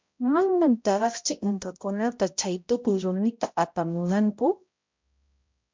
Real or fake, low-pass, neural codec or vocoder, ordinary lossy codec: fake; 7.2 kHz; codec, 16 kHz, 0.5 kbps, X-Codec, HuBERT features, trained on balanced general audio; MP3, 64 kbps